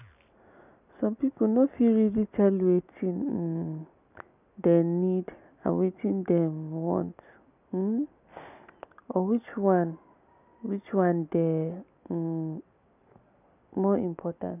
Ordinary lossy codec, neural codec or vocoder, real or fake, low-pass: none; none; real; 3.6 kHz